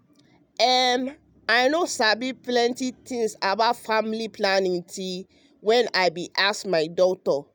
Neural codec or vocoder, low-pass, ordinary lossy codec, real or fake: none; none; none; real